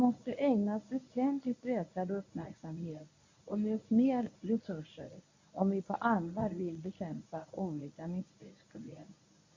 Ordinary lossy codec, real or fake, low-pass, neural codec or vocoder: AAC, 48 kbps; fake; 7.2 kHz; codec, 24 kHz, 0.9 kbps, WavTokenizer, medium speech release version 1